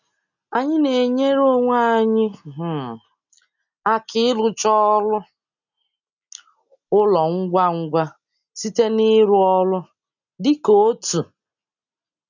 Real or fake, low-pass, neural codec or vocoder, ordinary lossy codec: real; 7.2 kHz; none; none